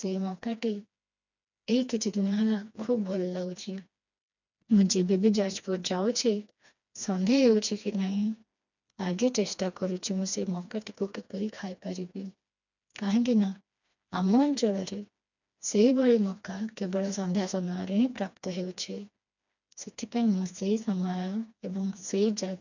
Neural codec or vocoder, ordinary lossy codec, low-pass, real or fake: codec, 16 kHz, 2 kbps, FreqCodec, smaller model; none; 7.2 kHz; fake